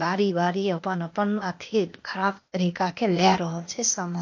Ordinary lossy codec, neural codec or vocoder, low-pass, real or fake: MP3, 48 kbps; codec, 16 kHz, 0.8 kbps, ZipCodec; 7.2 kHz; fake